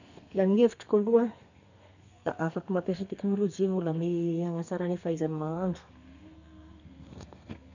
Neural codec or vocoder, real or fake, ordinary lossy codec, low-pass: codec, 32 kHz, 1.9 kbps, SNAC; fake; none; 7.2 kHz